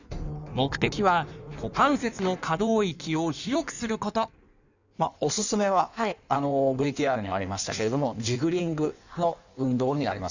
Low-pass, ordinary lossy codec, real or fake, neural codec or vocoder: 7.2 kHz; none; fake; codec, 16 kHz in and 24 kHz out, 1.1 kbps, FireRedTTS-2 codec